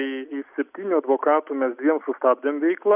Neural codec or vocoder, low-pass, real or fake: none; 3.6 kHz; real